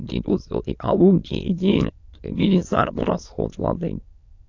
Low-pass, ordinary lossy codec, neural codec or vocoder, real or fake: 7.2 kHz; AAC, 48 kbps; autoencoder, 22.05 kHz, a latent of 192 numbers a frame, VITS, trained on many speakers; fake